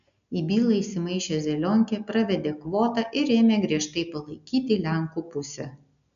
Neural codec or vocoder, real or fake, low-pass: none; real; 7.2 kHz